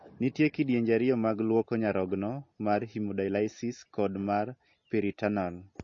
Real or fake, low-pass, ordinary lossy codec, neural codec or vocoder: real; 7.2 kHz; MP3, 32 kbps; none